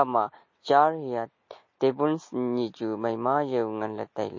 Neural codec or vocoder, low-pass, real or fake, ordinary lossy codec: none; 7.2 kHz; real; MP3, 32 kbps